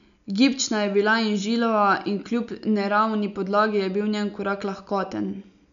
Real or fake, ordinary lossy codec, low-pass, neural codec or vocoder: real; none; 7.2 kHz; none